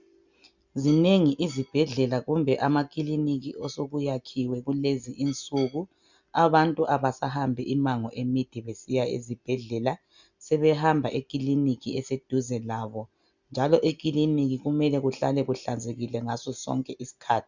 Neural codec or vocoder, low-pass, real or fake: none; 7.2 kHz; real